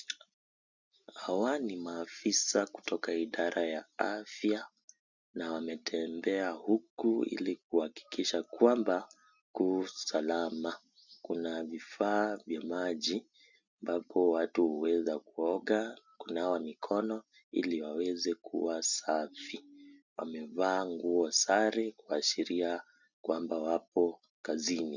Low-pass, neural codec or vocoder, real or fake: 7.2 kHz; none; real